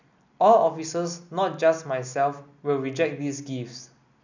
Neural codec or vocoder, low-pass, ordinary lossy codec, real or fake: none; 7.2 kHz; none; real